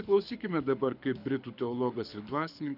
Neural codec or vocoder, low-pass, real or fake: codec, 24 kHz, 6 kbps, HILCodec; 5.4 kHz; fake